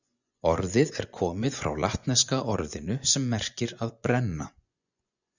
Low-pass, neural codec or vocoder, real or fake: 7.2 kHz; vocoder, 24 kHz, 100 mel bands, Vocos; fake